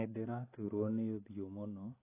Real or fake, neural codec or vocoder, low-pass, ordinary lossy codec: real; none; 3.6 kHz; AAC, 16 kbps